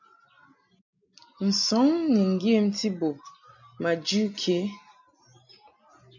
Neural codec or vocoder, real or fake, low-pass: none; real; 7.2 kHz